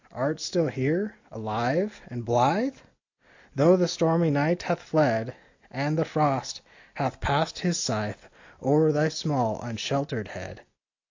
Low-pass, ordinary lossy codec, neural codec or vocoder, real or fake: 7.2 kHz; AAC, 48 kbps; none; real